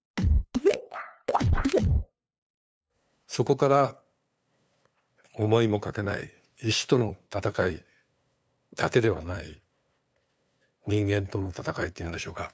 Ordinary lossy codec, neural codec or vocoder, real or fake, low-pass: none; codec, 16 kHz, 2 kbps, FunCodec, trained on LibriTTS, 25 frames a second; fake; none